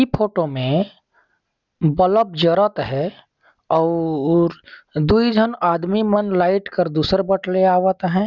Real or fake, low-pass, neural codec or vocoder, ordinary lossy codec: real; 7.2 kHz; none; none